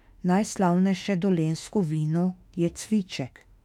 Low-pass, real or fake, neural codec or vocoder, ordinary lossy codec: 19.8 kHz; fake; autoencoder, 48 kHz, 32 numbers a frame, DAC-VAE, trained on Japanese speech; none